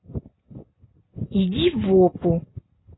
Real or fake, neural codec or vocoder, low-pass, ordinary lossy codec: real; none; 7.2 kHz; AAC, 16 kbps